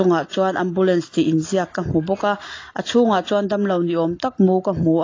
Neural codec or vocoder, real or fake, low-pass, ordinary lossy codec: none; real; 7.2 kHz; AAC, 32 kbps